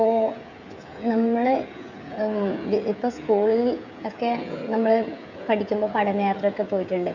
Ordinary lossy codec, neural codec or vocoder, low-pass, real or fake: none; codec, 16 kHz, 16 kbps, FreqCodec, smaller model; 7.2 kHz; fake